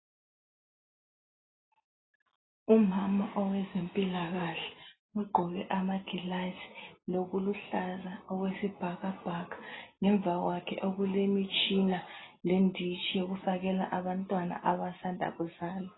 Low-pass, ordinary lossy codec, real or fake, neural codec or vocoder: 7.2 kHz; AAC, 16 kbps; real; none